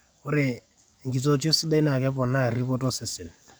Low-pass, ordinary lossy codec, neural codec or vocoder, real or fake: none; none; codec, 44.1 kHz, 7.8 kbps, DAC; fake